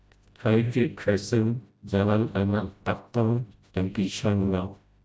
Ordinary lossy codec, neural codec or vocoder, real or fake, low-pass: none; codec, 16 kHz, 0.5 kbps, FreqCodec, smaller model; fake; none